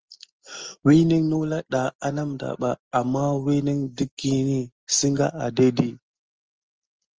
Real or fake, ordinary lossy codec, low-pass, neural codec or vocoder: real; Opus, 24 kbps; 7.2 kHz; none